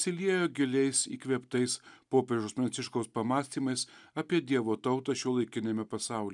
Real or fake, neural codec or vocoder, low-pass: real; none; 10.8 kHz